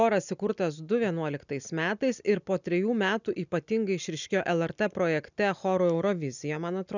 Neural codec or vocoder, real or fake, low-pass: none; real; 7.2 kHz